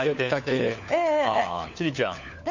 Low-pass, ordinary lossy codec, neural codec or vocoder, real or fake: 7.2 kHz; none; codec, 16 kHz, 4 kbps, FunCodec, trained on LibriTTS, 50 frames a second; fake